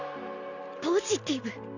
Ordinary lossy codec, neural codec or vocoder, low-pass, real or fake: none; none; 7.2 kHz; real